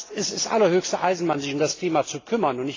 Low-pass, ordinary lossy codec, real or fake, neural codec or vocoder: 7.2 kHz; AAC, 32 kbps; real; none